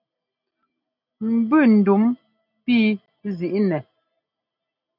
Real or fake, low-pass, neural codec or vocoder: real; 5.4 kHz; none